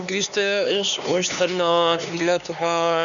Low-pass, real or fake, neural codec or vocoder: 7.2 kHz; fake; codec, 16 kHz, 2 kbps, X-Codec, HuBERT features, trained on LibriSpeech